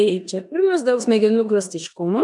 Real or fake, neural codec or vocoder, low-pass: fake; codec, 16 kHz in and 24 kHz out, 0.9 kbps, LongCat-Audio-Codec, four codebook decoder; 10.8 kHz